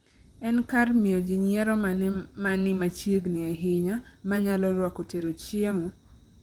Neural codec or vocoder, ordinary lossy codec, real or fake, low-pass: vocoder, 44.1 kHz, 128 mel bands, Pupu-Vocoder; Opus, 32 kbps; fake; 19.8 kHz